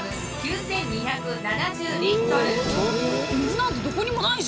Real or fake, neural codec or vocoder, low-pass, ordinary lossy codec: real; none; none; none